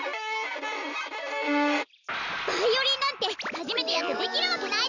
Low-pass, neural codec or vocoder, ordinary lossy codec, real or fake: 7.2 kHz; none; none; real